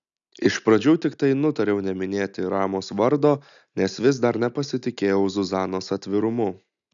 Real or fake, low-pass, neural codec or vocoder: real; 7.2 kHz; none